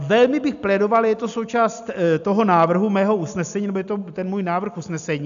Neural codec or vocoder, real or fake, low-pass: none; real; 7.2 kHz